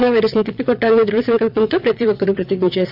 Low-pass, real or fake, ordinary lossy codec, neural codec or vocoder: 5.4 kHz; fake; AAC, 48 kbps; vocoder, 22.05 kHz, 80 mel bands, WaveNeXt